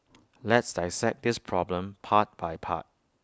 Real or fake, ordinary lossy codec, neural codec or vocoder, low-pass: real; none; none; none